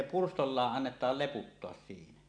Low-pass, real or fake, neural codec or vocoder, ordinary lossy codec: 9.9 kHz; real; none; none